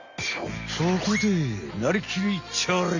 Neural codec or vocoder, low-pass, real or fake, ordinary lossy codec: none; 7.2 kHz; real; none